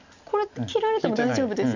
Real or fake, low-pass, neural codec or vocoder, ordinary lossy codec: real; 7.2 kHz; none; none